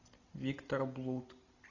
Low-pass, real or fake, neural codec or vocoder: 7.2 kHz; real; none